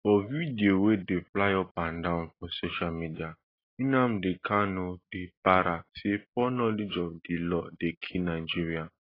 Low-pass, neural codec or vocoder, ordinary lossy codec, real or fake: 5.4 kHz; none; AAC, 24 kbps; real